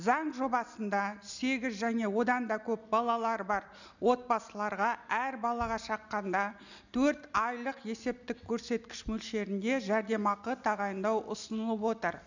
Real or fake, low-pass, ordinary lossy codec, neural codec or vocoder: real; 7.2 kHz; none; none